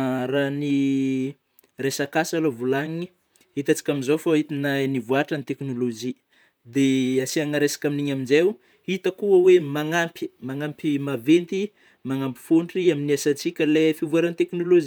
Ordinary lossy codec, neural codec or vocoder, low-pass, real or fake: none; vocoder, 44.1 kHz, 128 mel bands, Pupu-Vocoder; none; fake